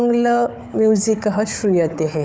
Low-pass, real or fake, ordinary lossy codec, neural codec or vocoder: none; fake; none; codec, 16 kHz, 4 kbps, FunCodec, trained on Chinese and English, 50 frames a second